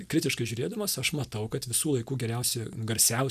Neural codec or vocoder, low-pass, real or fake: none; 14.4 kHz; real